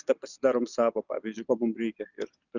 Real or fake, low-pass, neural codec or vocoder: real; 7.2 kHz; none